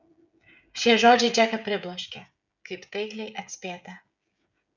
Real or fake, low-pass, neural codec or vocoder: fake; 7.2 kHz; codec, 16 kHz, 16 kbps, FreqCodec, smaller model